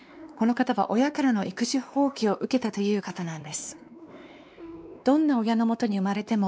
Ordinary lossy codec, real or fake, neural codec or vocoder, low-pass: none; fake; codec, 16 kHz, 2 kbps, X-Codec, WavLM features, trained on Multilingual LibriSpeech; none